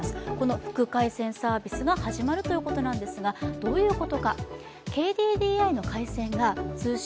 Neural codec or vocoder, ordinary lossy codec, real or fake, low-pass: none; none; real; none